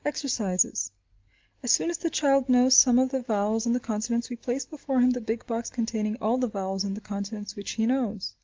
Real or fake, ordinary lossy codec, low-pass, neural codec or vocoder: real; Opus, 24 kbps; 7.2 kHz; none